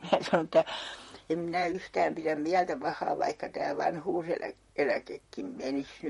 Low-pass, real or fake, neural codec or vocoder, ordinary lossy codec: 19.8 kHz; fake; vocoder, 44.1 kHz, 128 mel bands, Pupu-Vocoder; MP3, 48 kbps